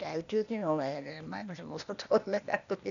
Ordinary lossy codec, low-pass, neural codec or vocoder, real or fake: none; 7.2 kHz; codec, 16 kHz, 0.8 kbps, ZipCodec; fake